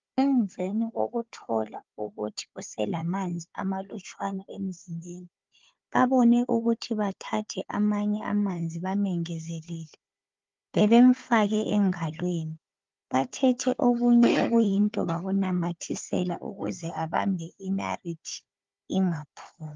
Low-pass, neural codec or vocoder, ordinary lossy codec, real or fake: 7.2 kHz; codec, 16 kHz, 4 kbps, FunCodec, trained on Chinese and English, 50 frames a second; Opus, 32 kbps; fake